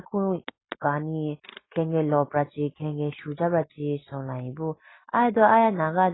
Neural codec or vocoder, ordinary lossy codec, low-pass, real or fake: none; AAC, 16 kbps; 7.2 kHz; real